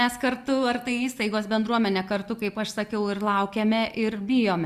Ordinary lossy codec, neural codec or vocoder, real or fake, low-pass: Opus, 64 kbps; none; real; 14.4 kHz